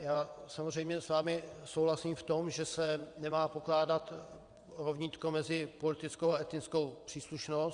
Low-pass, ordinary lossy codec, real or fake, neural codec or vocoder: 9.9 kHz; Opus, 64 kbps; fake; vocoder, 22.05 kHz, 80 mel bands, WaveNeXt